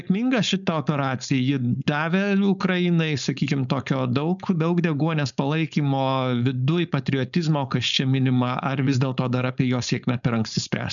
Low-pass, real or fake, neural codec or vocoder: 7.2 kHz; fake; codec, 16 kHz, 4.8 kbps, FACodec